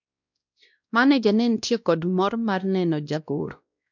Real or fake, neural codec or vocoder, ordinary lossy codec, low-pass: fake; codec, 16 kHz, 1 kbps, X-Codec, WavLM features, trained on Multilingual LibriSpeech; none; 7.2 kHz